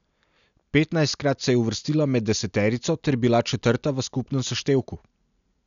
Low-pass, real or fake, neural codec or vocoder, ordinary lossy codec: 7.2 kHz; real; none; none